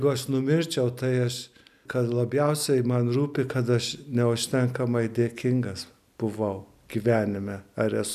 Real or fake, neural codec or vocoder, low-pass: real; none; 14.4 kHz